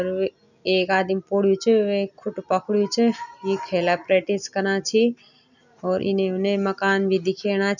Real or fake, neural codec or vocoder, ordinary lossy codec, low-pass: real; none; none; 7.2 kHz